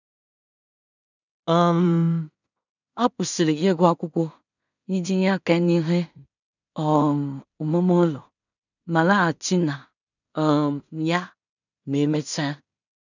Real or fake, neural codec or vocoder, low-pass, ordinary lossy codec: fake; codec, 16 kHz in and 24 kHz out, 0.4 kbps, LongCat-Audio-Codec, two codebook decoder; 7.2 kHz; none